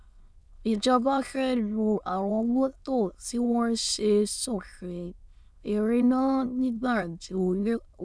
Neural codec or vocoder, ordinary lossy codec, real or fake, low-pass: autoencoder, 22.05 kHz, a latent of 192 numbers a frame, VITS, trained on many speakers; none; fake; none